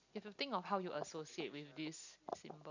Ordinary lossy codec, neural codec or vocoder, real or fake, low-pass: none; none; real; 7.2 kHz